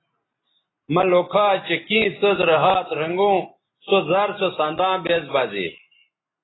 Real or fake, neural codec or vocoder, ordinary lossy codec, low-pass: real; none; AAC, 16 kbps; 7.2 kHz